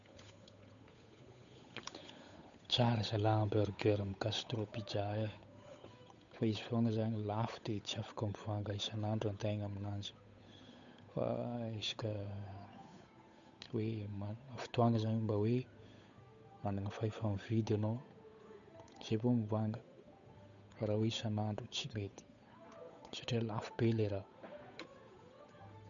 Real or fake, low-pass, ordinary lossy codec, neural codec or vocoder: fake; 7.2 kHz; none; codec, 16 kHz, 8 kbps, FunCodec, trained on Chinese and English, 25 frames a second